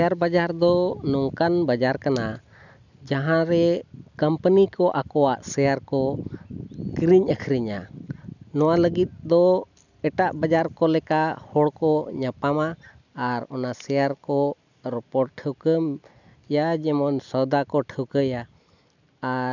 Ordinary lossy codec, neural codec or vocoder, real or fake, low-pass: none; none; real; 7.2 kHz